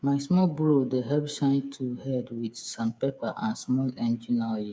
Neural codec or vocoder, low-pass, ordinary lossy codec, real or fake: codec, 16 kHz, 8 kbps, FreqCodec, smaller model; none; none; fake